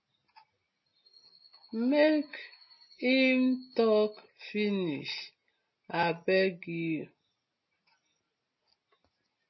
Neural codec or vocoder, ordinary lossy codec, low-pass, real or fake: none; MP3, 24 kbps; 7.2 kHz; real